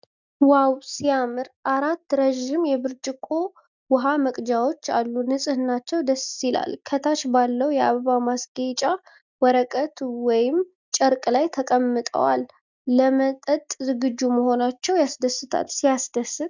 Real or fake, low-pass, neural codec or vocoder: real; 7.2 kHz; none